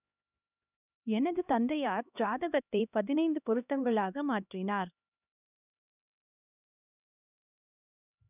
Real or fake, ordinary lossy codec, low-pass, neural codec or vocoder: fake; none; 3.6 kHz; codec, 16 kHz, 1 kbps, X-Codec, HuBERT features, trained on LibriSpeech